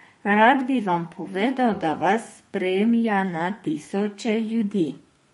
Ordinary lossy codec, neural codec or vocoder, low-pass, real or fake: MP3, 48 kbps; codec, 32 kHz, 1.9 kbps, SNAC; 14.4 kHz; fake